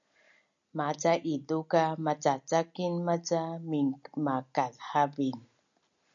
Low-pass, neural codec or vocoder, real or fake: 7.2 kHz; none; real